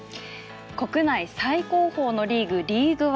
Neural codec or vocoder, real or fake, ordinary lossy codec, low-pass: none; real; none; none